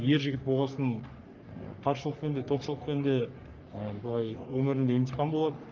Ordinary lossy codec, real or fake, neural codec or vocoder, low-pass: Opus, 24 kbps; fake; codec, 44.1 kHz, 3.4 kbps, Pupu-Codec; 7.2 kHz